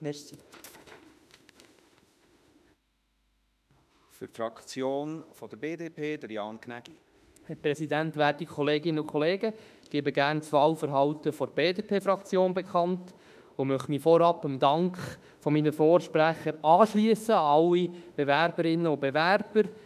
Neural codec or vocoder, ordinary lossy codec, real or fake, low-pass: autoencoder, 48 kHz, 32 numbers a frame, DAC-VAE, trained on Japanese speech; none; fake; 14.4 kHz